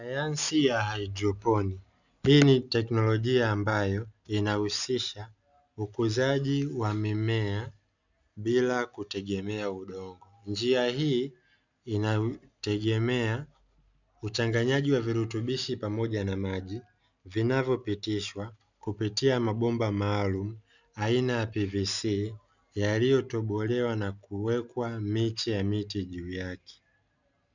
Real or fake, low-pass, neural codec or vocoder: real; 7.2 kHz; none